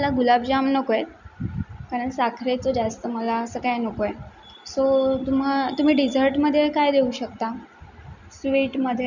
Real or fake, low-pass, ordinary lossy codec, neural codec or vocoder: real; 7.2 kHz; none; none